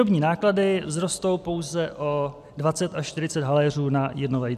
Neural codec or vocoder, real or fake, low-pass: none; real; 14.4 kHz